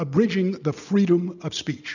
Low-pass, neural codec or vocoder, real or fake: 7.2 kHz; none; real